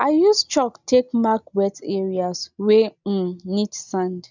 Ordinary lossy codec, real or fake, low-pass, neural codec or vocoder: none; real; 7.2 kHz; none